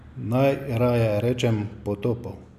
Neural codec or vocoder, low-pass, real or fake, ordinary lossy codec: none; 14.4 kHz; real; none